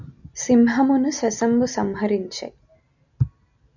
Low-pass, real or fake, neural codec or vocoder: 7.2 kHz; real; none